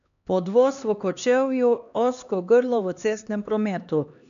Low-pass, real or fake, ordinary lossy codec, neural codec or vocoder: 7.2 kHz; fake; none; codec, 16 kHz, 2 kbps, X-Codec, HuBERT features, trained on LibriSpeech